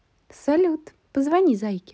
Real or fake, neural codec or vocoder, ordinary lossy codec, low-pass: real; none; none; none